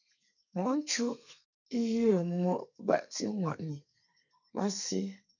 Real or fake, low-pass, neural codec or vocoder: fake; 7.2 kHz; codec, 32 kHz, 1.9 kbps, SNAC